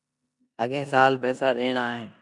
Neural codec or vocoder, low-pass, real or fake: codec, 16 kHz in and 24 kHz out, 0.9 kbps, LongCat-Audio-Codec, four codebook decoder; 10.8 kHz; fake